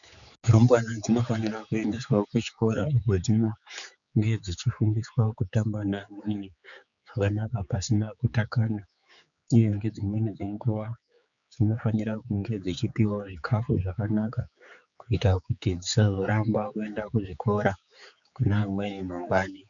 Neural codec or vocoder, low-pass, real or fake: codec, 16 kHz, 4 kbps, X-Codec, HuBERT features, trained on general audio; 7.2 kHz; fake